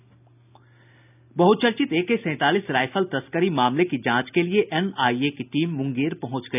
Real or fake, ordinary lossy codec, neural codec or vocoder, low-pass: real; none; none; 3.6 kHz